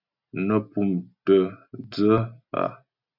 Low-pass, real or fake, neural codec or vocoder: 5.4 kHz; real; none